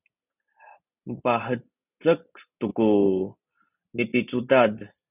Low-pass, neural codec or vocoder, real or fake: 3.6 kHz; none; real